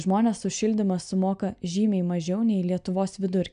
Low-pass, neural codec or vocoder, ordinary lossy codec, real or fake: 9.9 kHz; none; MP3, 96 kbps; real